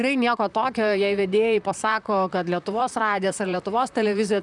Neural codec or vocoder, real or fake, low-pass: vocoder, 44.1 kHz, 128 mel bands, Pupu-Vocoder; fake; 10.8 kHz